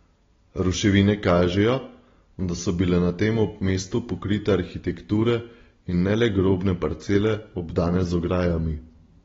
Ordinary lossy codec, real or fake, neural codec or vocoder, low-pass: AAC, 24 kbps; real; none; 7.2 kHz